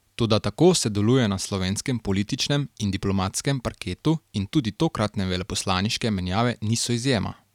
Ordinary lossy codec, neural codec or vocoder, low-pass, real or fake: none; none; 19.8 kHz; real